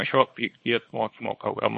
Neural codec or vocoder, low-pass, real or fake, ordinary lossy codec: codec, 24 kHz, 0.9 kbps, WavTokenizer, small release; 10.8 kHz; fake; MP3, 32 kbps